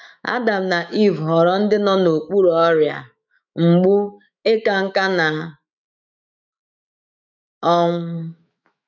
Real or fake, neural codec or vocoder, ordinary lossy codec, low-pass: fake; autoencoder, 48 kHz, 128 numbers a frame, DAC-VAE, trained on Japanese speech; none; 7.2 kHz